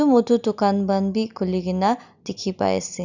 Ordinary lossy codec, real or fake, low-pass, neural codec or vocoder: Opus, 64 kbps; real; 7.2 kHz; none